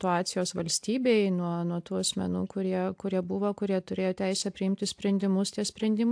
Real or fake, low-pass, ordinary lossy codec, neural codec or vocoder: real; 9.9 kHz; AAC, 64 kbps; none